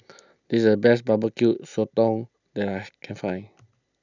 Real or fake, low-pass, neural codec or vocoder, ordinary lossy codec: real; 7.2 kHz; none; none